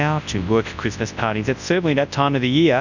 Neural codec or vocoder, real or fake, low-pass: codec, 24 kHz, 0.9 kbps, WavTokenizer, large speech release; fake; 7.2 kHz